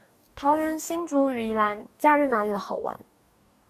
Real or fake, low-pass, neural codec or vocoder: fake; 14.4 kHz; codec, 44.1 kHz, 2.6 kbps, DAC